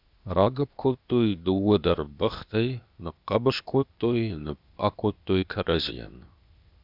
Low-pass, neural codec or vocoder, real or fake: 5.4 kHz; codec, 16 kHz, 0.8 kbps, ZipCodec; fake